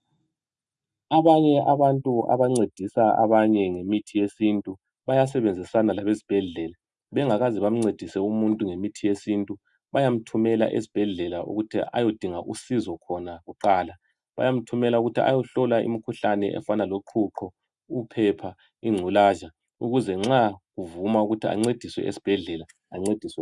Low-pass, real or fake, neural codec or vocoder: 10.8 kHz; real; none